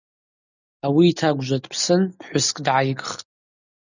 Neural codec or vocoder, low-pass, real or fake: none; 7.2 kHz; real